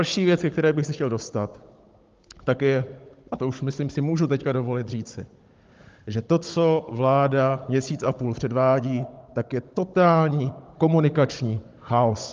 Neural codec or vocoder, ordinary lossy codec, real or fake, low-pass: codec, 16 kHz, 16 kbps, FunCodec, trained on Chinese and English, 50 frames a second; Opus, 32 kbps; fake; 7.2 kHz